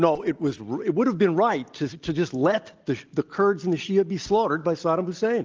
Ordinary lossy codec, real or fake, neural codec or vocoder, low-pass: Opus, 24 kbps; real; none; 7.2 kHz